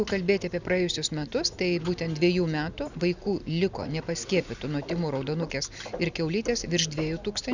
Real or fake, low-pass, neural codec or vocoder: real; 7.2 kHz; none